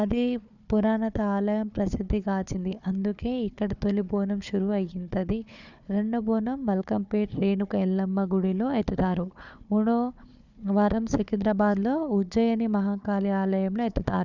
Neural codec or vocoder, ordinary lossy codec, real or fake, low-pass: codec, 16 kHz, 4 kbps, FunCodec, trained on Chinese and English, 50 frames a second; none; fake; 7.2 kHz